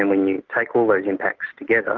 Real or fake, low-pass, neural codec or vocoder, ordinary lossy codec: real; 7.2 kHz; none; Opus, 24 kbps